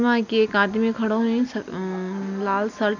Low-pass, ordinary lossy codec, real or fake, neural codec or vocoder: 7.2 kHz; none; real; none